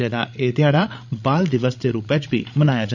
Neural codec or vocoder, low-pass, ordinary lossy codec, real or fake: codec, 16 kHz, 16 kbps, FreqCodec, larger model; 7.2 kHz; none; fake